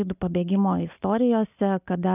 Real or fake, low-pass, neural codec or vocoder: real; 3.6 kHz; none